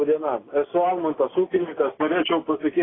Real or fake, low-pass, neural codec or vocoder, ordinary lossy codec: real; 7.2 kHz; none; AAC, 16 kbps